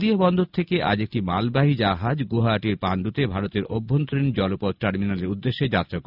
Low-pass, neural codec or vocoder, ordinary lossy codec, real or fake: 5.4 kHz; none; none; real